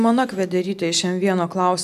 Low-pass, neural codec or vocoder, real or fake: 14.4 kHz; none; real